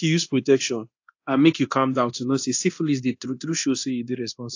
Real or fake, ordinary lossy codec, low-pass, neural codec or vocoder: fake; AAC, 48 kbps; 7.2 kHz; codec, 24 kHz, 0.9 kbps, DualCodec